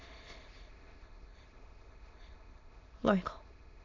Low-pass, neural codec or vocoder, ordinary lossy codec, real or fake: 7.2 kHz; autoencoder, 22.05 kHz, a latent of 192 numbers a frame, VITS, trained on many speakers; AAC, 48 kbps; fake